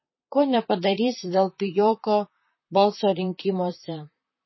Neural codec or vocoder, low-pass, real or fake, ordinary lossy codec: vocoder, 44.1 kHz, 128 mel bands, Pupu-Vocoder; 7.2 kHz; fake; MP3, 24 kbps